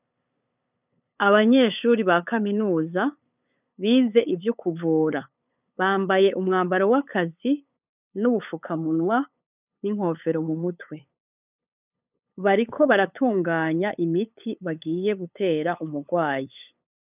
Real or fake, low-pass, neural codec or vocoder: fake; 3.6 kHz; codec, 16 kHz, 8 kbps, FunCodec, trained on LibriTTS, 25 frames a second